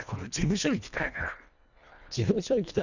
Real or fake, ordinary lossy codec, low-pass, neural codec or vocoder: fake; none; 7.2 kHz; codec, 24 kHz, 1.5 kbps, HILCodec